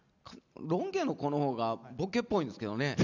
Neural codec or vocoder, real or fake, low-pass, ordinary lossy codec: none; real; 7.2 kHz; none